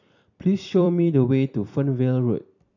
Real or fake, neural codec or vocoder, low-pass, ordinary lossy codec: fake; vocoder, 44.1 kHz, 128 mel bands every 256 samples, BigVGAN v2; 7.2 kHz; none